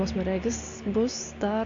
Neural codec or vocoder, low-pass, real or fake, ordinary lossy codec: none; 7.2 kHz; real; MP3, 48 kbps